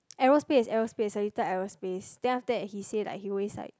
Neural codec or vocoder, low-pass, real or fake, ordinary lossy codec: none; none; real; none